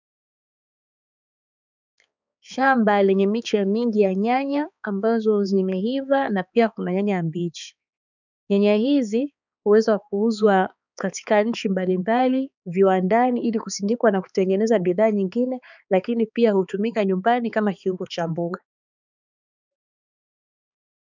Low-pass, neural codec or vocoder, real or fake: 7.2 kHz; codec, 16 kHz, 4 kbps, X-Codec, HuBERT features, trained on balanced general audio; fake